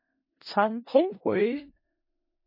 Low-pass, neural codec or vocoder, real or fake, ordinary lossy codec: 5.4 kHz; codec, 16 kHz in and 24 kHz out, 0.4 kbps, LongCat-Audio-Codec, four codebook decoder; fake; MP3, 24 kbps